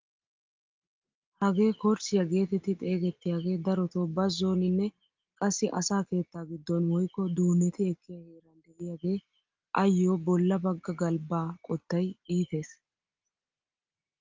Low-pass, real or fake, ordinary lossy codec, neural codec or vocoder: 7.2 kHz; real; Opus, 24 kbps; none